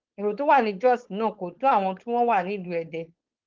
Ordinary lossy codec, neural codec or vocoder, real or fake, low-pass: Opus, 16 kbps; codec, 16 kHz, 4.8 kbps, FACodec; fake; 7.2 kHz